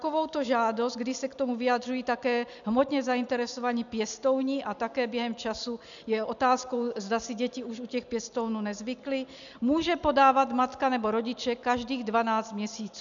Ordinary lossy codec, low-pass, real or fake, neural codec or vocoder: MP3, 96 kbps; 7.2 kHz; real; none